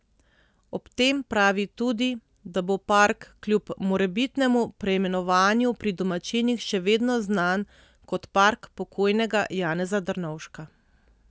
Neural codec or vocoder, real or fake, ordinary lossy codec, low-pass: none; real; none; none